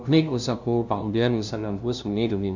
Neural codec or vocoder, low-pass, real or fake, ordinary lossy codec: codec, 16 kHz, 0.5 kbps, FunCodec, trained on LibriTTS, 25 frames a second; 7.2 kHz; fake; none